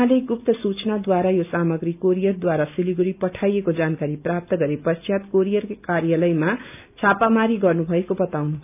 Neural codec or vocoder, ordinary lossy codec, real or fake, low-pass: none; none; real; 3.6 kHz